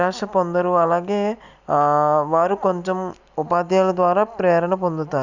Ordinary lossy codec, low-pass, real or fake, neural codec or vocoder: none; 7.2 kHz; real; none